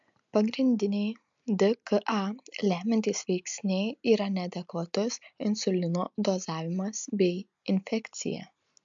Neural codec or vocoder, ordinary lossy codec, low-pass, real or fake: none; MP3, 64 kbps; 7.2 kHz; real